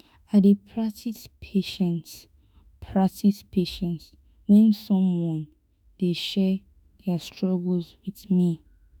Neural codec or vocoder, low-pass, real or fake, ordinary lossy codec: autoencoder, 48 kHz, 32 numbers a frame, DAC-VAE, trained on Japanese speech; none; fake; none